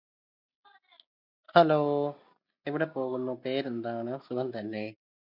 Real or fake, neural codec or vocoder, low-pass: real; none; 5.4 kHz